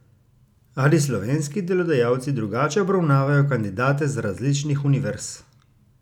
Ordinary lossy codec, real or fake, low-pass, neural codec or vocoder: none; real; 19.8 kHz; none